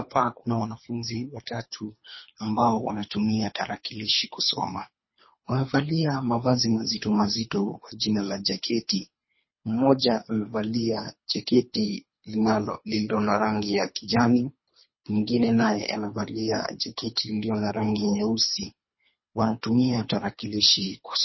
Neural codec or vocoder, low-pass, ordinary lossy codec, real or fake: codec, 24 kHz, 3 kbps, HILCodec; 7.2 kHz; MP3, 24 kbps; fake